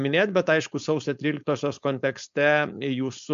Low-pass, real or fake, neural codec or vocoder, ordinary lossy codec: 7.2 kHz; fake; codec, 16 kHz, 4.8 kbps, FACodec; MP3, 64 kbps